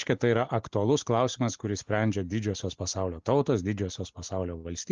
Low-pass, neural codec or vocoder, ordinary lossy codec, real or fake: 7.2 kHz; none; Opus, 24 kbps; real